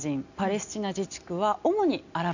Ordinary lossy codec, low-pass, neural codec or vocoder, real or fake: none; 7.2 kHz; none; real